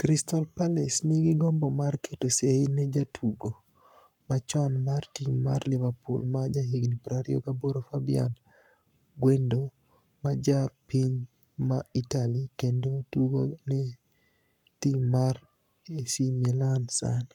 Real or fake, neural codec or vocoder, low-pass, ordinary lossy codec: fake; codec, 44.1 kHz, 7.8 kbps, Pupu-Codec; 19.8 kHz; none